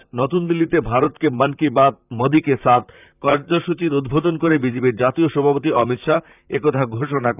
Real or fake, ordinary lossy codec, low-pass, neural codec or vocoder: fake; none; 3.6 kHz; vocoder, 44.1 kHz, 128 mel bands, Pupu-Vocoder